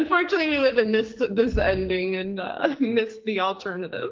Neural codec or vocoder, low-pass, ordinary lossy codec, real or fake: codec, 44.1 kHz, 2.6 kbps, SNAC; 7.2 kHz; Opus, 32 kbps; fake